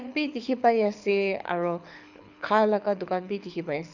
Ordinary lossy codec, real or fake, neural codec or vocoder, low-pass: none; fake; codec, 24 kHz, 6 kbps, HILCodec; 7.2 kHz